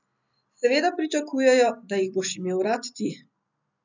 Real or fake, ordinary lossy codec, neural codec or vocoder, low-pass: real; none; none; 7.2 kHz